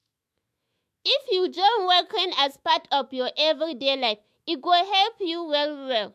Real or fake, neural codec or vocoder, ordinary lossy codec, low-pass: fake; autoencoder, 48 kHz, 128 numbers a frame, DAC-VAE, trained on Japanese speech; MP3, 64 kbps; 14.4 kHz